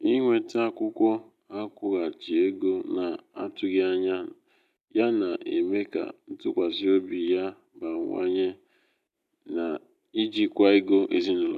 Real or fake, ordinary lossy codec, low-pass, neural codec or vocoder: real; none; 14.4 kHz; none